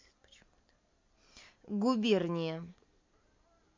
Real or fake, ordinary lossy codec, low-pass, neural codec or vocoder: real; MP3, 48 kbps; 7.2 kHz; none